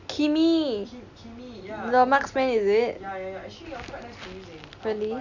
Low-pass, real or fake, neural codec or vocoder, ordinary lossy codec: 7.2 kHz; real; none; none